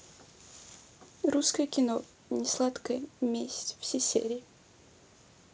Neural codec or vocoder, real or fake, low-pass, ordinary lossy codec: none; real; none; none